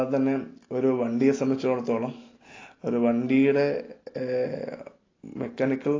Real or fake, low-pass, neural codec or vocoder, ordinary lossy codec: real; 7.2 kHz; none; AAC, 32 kbps